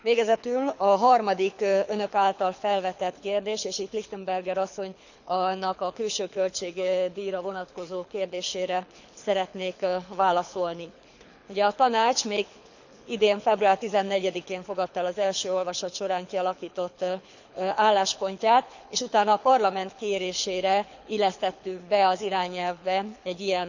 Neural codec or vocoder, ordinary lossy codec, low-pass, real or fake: codec, 24 kHz, 6 kbps, HILCodec; none; 7.2 kHz; fake